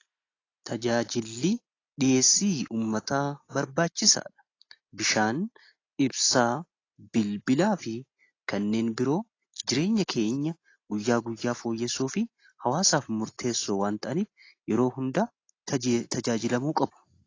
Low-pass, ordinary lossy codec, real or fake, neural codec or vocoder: 7.2 kHz; AAC, 32 kbps; real; none